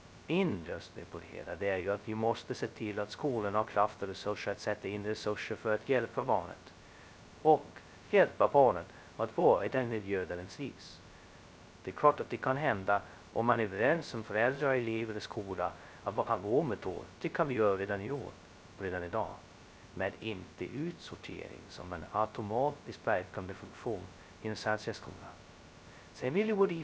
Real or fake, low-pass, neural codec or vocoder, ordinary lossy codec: fake; none; codec, 16 kHz, 0.2 kbps, FocalCodec; none